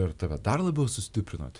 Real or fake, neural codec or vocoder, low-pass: real; none; 10.8 kHz